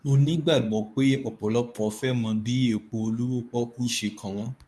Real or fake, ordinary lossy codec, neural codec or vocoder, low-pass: fake; none; codec, 24 kHz, 0.9 kbps, WavTokenizer, medium speech release version 2; none